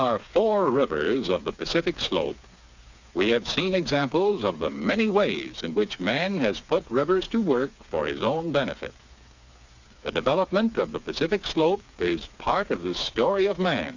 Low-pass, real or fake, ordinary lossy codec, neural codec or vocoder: 7.2 kHz; fake; Opus, 64 kbps; codec, 16 kHz, 4 kbps, FreqCodec, smaller model